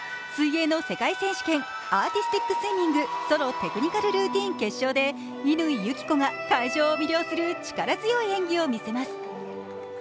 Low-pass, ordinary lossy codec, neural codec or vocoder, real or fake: none; none; none; real